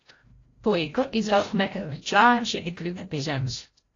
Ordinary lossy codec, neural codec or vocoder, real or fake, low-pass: AAC, 32 kbps; codec, 16 kHz, 0.5 kbps, FreqCodec, larger model; fake; 7.2 kHz